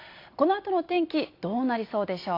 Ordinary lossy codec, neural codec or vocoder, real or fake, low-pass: AAC, 32 kbps; none; real; 5.4 kHz